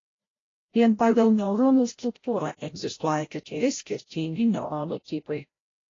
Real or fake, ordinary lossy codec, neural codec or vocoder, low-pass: fake; AAC, 32 kbps; codec, 16 kHz, 0.5 kbps, FreqCodec, larger model; 7.2 kHz